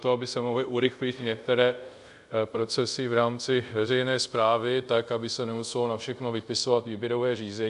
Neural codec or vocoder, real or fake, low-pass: codec, 24 kHz, 0.5 kbps, DualCodec; fake; 10.8 kHz